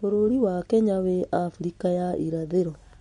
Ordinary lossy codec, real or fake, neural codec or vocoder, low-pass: MP3, 48 kbps; real; none; 19.8 kHz